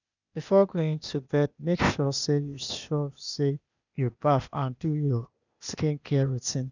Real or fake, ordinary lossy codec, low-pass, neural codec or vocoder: fake; none; 7.2 kHz; codec, 16 kHz, 0.8 kbps, ZipCodec